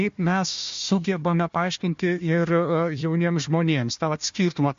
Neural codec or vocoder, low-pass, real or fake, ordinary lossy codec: codec, 16 kHz, 1 kbps, FunCodec, trained on Chinese and English, 50 frames a second; 7.2 kHz; fake; MP3, 48 kbps